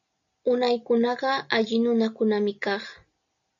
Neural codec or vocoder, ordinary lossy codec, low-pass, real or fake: none; AAC, 64 kbps; 7.2 kHz; real